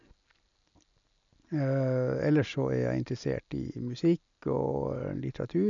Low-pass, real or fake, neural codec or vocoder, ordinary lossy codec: 7.2 kHz; real; none; none